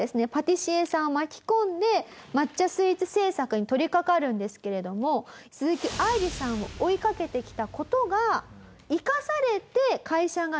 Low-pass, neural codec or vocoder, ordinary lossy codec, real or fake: none; none; none; real